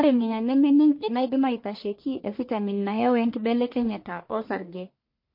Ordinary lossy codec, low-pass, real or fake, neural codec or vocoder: MP3, 32 kbps; 5.4 kHz; fake; codec, 44.1 kHz, 1.7 kbps, Pupu-Codec